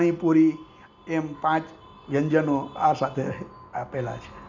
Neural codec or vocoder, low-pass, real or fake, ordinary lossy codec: none; 7.2 kHz; real; none